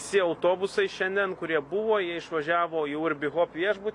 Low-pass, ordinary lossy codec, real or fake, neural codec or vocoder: 10.8 kHz; AAC, 64 kbps; real; none